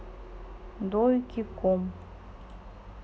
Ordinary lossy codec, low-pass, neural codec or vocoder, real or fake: none; none; none; real